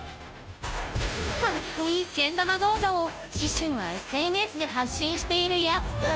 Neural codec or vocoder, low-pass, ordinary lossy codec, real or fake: codec, 16 kHz, 0.5 kbps, FunCodec, trained on Chinese and English, 25 frames a second; none; none; fake